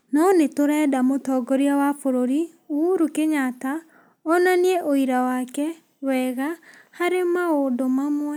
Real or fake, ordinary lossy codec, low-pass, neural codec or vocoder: real; none; none; none